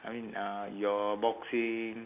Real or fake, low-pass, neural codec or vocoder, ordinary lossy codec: real; 3.6 kHz; none; none